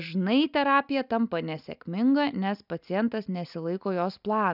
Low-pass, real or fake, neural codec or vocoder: 5.4 kHz; real; none